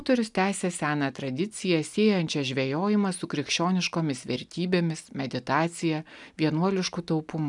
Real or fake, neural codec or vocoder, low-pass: real; none; 10.8 kHz